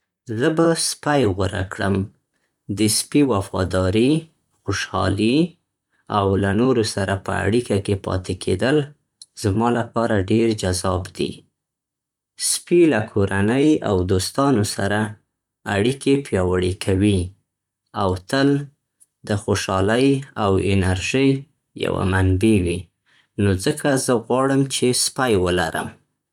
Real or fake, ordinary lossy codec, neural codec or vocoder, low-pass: fake; none; vocoder, 44.1 kHz, 128 mel bands, Pupu-Vocoder; 19.8 kHz